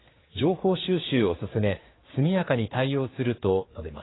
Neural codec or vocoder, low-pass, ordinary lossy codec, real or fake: codec, 16 kHz, 4 kbps, X-Codec, HuBERT features, trained on general audio; 7.2 kHz; AAC, 16 kbps; fake